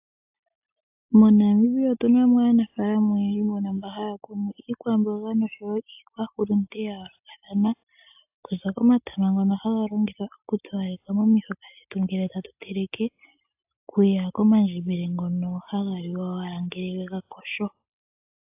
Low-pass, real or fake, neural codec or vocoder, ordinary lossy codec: 3.6 kHz; real; none; AAC, 32 kbps